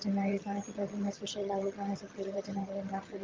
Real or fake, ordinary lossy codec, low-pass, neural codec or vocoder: fake; Opus, 32 kbps; 7.2 kHz; vocoder, 22.05 kHz, 80 mel bands, HiFi-GAN